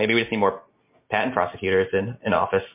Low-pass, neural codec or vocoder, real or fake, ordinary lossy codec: 3.6 kHz; none; real; MP3, 24 kbps